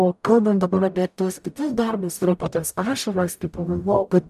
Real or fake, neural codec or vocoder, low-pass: fake; codec, 44.1 kHz, 0.9 kbps, DAC; 14.4 kHz